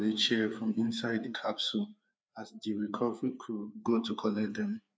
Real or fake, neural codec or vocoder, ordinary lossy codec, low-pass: fake; codec, 16 kHz, 4 kbps, FreqCodec, larger model; none; none